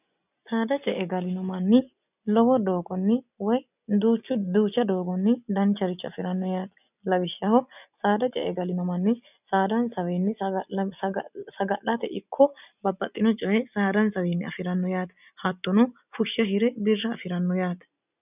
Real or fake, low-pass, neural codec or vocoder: real; 3.6 kHz; none